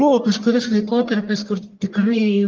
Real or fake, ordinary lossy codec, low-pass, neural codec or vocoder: fake; Opus, 32 kbps; 7.2 kHz; codec, 44.1 kHz, 1.7 kbps, Pupu-Codec